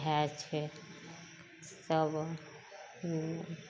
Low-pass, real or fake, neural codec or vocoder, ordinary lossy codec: none; real; none; none